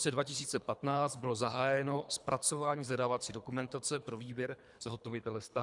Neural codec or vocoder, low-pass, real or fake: codec, 24 kHz, 3 kbps, HILCodec; 10.8 kHz; fake